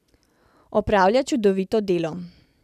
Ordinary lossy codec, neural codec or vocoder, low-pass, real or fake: none; none; 14.4 kHz; real